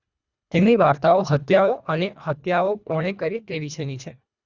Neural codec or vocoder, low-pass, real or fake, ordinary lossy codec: codec, 24 kHz, 1.5 kbps, HILCodec; 7.2 kHz; fake; Opus, 64 kbps